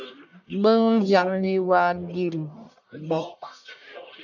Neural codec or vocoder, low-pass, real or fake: codec, 44.1 kHz, 1.7 kbps, Pupu-Codec; 7.2 kHz; fake